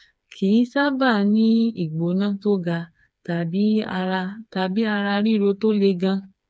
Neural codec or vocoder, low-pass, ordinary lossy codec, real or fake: codec, 16 kHz, 4 kbps, FreqCodec, smaller model; none; none; fake